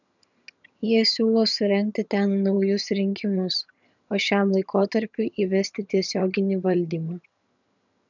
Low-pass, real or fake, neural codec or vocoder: 7.2 kHz; fake; vocoder, 22.05 kHz, 80 mel bands, HiFi-GAN